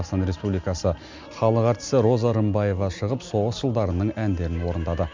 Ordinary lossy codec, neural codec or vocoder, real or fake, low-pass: MP3, 64 kbps; none; real; 7.2 kHz